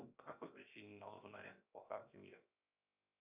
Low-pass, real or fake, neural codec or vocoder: 3.6 kHz; fake; codec, 16 kHz, 0.7 kbps, FocalCodec